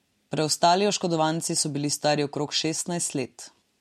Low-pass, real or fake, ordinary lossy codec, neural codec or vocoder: 19.8 kHz; real; MP3, 64 kbps; none